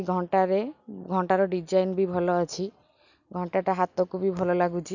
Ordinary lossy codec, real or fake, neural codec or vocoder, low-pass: none; real; none; 7.2 kHz